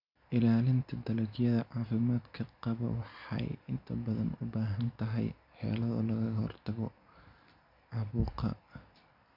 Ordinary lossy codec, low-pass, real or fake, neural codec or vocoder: none; 5.4 kHz; real; none